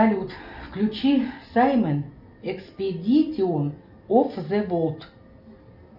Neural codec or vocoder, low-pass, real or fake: none; 5.4 kHz; real